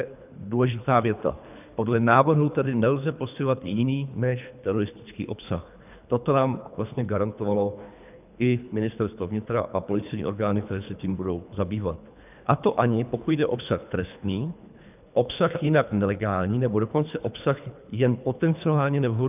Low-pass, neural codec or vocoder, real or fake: 3.6 kHz; codec, 24 kHz, 3 kbps, HILCodec; fake